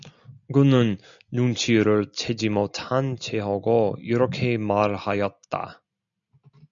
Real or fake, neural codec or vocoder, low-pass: real; none; 7.2 kHz